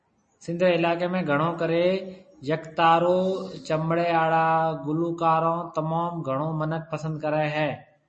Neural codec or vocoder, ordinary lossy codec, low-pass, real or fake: none; MP3, 32 kbps; 9.9 kHz; real